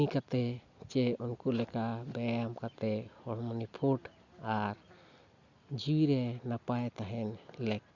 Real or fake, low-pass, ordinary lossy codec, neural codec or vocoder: real; 7.2 kHz; Opus, 64 kbps; none